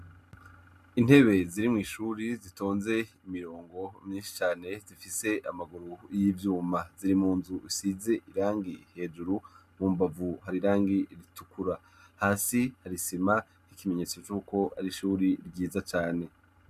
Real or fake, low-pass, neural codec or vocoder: real; 14.4 kHz; none